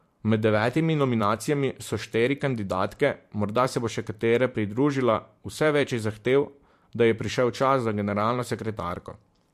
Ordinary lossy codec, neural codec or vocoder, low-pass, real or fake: MP3, 64 kbps; vocoder, 44.1 kHz, 128 mel bands every 512 samples, BigVGAN v2; 14.4 kHz; fake